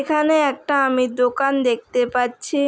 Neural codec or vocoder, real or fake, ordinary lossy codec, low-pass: none; real; none; none